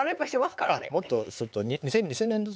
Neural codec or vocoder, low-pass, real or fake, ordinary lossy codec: codec, 16 kHz, 4 kbps, X-Codec, HuBERT features, trained on LibriSpeech; none; fake; none